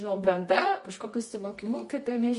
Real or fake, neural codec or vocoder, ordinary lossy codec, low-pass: fake; codec, 24 kHz, 0.9 kbps, WavTokenizer, medium music audio release; MP3, 48 kbps; 10.8 kHz